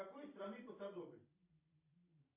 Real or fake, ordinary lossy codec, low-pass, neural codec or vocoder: fake; AAC, 24 kbps; 3.6 kHz; vocoder, 44.1 kHz, 128 mel bands every 256 samples, BigVGAN v2